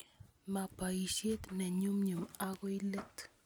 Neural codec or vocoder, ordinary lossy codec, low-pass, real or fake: none; none; none; real